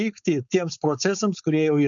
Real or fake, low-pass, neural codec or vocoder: fake; 7.2 kHz; codec, 16 kHz, 4.8 kbps, FACodec